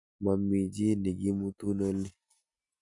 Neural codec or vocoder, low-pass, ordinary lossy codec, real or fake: none; 10.8 kHz; none; real